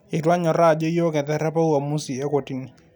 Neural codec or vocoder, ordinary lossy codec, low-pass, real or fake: none; none; none; real